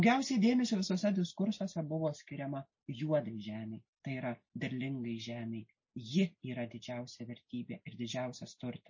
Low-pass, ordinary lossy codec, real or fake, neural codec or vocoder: 7.2 kHz; MP3, 32 kbps; real; none